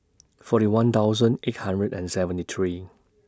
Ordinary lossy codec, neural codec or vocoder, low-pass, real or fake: none; none; none; real